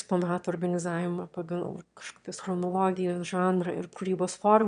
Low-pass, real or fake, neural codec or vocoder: 9.9 kHz; fake; autoencoder, 22.05 kHz, a latent of 192 numbers a frame, VITS, trained on one speaker